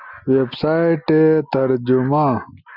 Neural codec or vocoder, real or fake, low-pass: none; real; 5.4 kHz